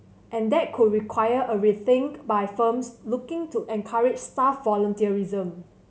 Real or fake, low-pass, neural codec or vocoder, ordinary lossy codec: real; none; none; none